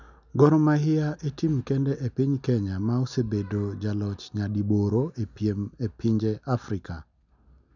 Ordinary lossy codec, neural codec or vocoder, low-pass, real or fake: none; none; 7.2 kHz; real